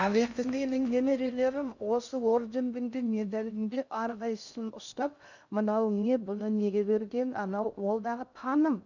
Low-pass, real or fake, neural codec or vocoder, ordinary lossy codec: 7.2 kHz; fake; codec, 16 kHz in and 24 kHz out, 0.6 kbps, FocalCodec, streaming, 2048 codes; none